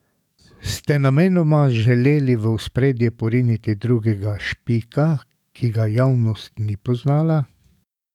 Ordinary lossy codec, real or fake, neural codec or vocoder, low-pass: none; fake; codec, 44.1 kHz, 7.8 kbps, DAC; 19.8 kHz